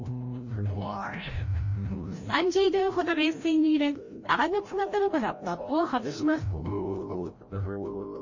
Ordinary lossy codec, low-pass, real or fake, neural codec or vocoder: MP3, 32 kbps; 7.2 kHz; fake; codec, 16 kHz, 0.5 kbps, FreqCodec, larger model